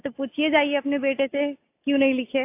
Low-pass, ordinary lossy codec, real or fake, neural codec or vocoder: 3.6 kHz; AAC, 24 kbps; real; none